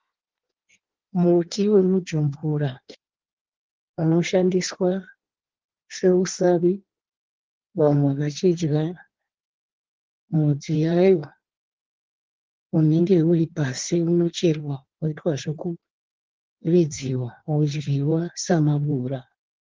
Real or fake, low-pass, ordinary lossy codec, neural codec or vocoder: fake; 7.2 kHz; Opus, 16 kbps; codec, 16 kHz in and 24 kHz out, 1.1 kbps, FireRedTTS-2 codec